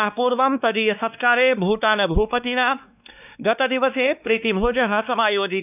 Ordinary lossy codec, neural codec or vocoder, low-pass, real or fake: none; codec, 16 kHz, 2 kbps, X-Codec, WavLM features, trained on Multilingual LibriSpeech; 3.6 kHz; fake